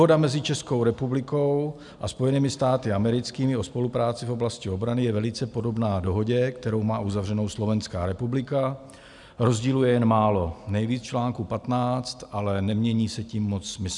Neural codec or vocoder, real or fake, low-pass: none; real; 10.8 kHz